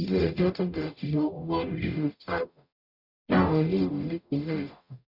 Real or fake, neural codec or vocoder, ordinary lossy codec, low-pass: fake; codec, 44.1 kHz, 0.9 kbps, DAC; none; 5.4 kHz